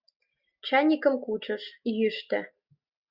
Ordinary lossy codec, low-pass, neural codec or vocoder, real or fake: Opus, 64 kbps; 5.4 kHz; none; real